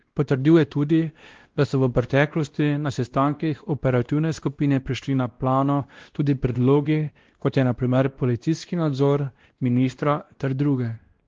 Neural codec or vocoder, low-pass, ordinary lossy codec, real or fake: codec, 16 kHz, 1 kbps, X-Codec, WavLM features, trained on Multilingual LibriSpeech; 7.2 kHz; Opus, 16 kbps; fake